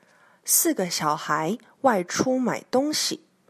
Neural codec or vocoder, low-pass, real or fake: none; 14.4 kHz; real